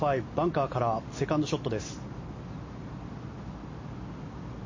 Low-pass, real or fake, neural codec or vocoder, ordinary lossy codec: 7.2 kHz; real; none; MP3, 32 kbps